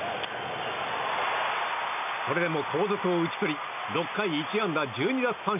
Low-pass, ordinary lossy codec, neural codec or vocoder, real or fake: 3.6 kHz; none; none; real